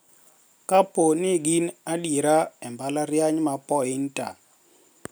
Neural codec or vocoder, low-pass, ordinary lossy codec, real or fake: none; none; none; real